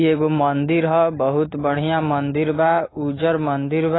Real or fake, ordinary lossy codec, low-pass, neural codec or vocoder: real; AAC, 16 kbps; 7.2 kHz; none